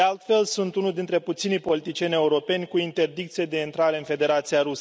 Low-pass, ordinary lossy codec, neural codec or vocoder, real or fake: none; none; none; real